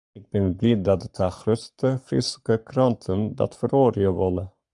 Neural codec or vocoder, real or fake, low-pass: codec, 44.1 kHz, 7.8 kbps, Pupu-Codec; fake; 10.8 kHz